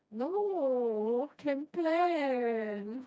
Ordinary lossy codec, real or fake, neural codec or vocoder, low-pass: none; fake; codec, 16 kHz, 1 kbps, FreqCodec, smaller model; none